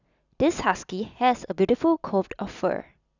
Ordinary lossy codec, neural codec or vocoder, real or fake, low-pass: none; none; real; 7.2 kHz